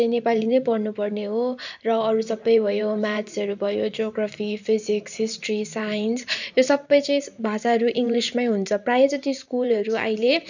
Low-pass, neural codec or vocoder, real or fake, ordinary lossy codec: 7.2 kHz; vocoder, 44.1 kHz, 128 mel bands every 512 samples, BigVGAN v2; fake; none